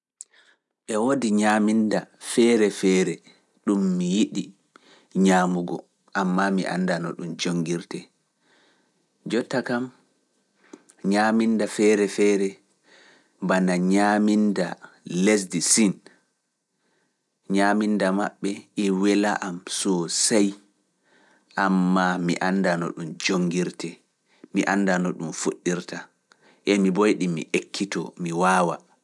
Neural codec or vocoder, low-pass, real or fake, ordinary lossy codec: none; none; real; none